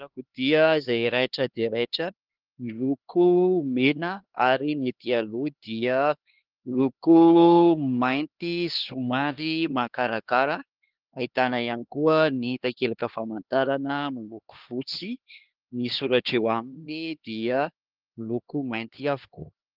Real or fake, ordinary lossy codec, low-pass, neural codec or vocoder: fake; Opus, 16 kbps; 5.4 kHz; codec, 16 kHz, 2 kbps, X-Codec, HuBERT features, trained on LibriSpeech